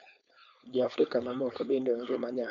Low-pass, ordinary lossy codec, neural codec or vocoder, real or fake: 7.2 kHz; none; codec, 16 kHz, 4.8 kbps, FACodec; fake